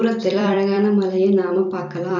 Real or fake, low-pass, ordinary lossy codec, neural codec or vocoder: fake; 7.2 kHz; none; vocoder, 44.1 kHz, 128 mel bands every 256 samples, BigVGAN v2